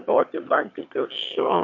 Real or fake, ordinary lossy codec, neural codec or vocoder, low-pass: fake; MP3, 48 kbps; autoencoder, 22.05 kHz, a latent of 192 numbers a frame, VITS, trained on one speaker; 7.2 kHz